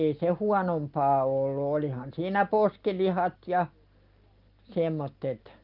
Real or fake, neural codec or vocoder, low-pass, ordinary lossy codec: real; none; 5.4 kHz; Opus, 32 kbps